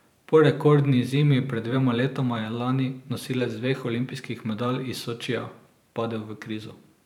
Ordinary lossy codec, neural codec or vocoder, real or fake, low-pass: none; vocoder, 44.1 kHz, 128 mel bands every 512 samples, BigVGAN v2; fake; 19.8 kHz